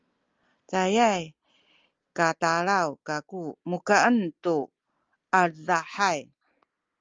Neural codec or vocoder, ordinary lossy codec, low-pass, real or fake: none; Opus, 32 kbps; 7.2 kHz; real